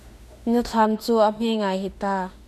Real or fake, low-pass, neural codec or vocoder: fake; 14.4 kHz; autoencoder, 48 kHz, 32 numbers a frame, DAC-VAE, trained on Japanese speech